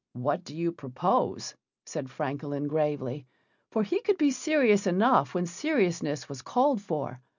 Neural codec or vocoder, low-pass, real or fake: none; 7.2 kHz; real